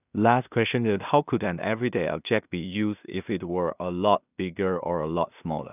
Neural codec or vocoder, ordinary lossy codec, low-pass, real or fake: codec, 16 kHz in and 24 kHz out, 0.4 kbps, LongCat-Audio-Codec, two codebook decoder; none; 3.6 kHz; fake